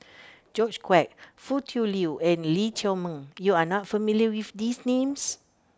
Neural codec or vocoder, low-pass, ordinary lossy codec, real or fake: none; none; none; real